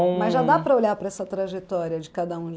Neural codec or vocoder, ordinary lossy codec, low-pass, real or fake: none; none; none; real